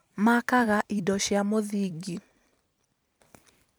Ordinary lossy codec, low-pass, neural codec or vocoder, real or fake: none; none; vocoder, 44.1 kHz, 128 mel bands every 256 samples, BigVGAN v2; fake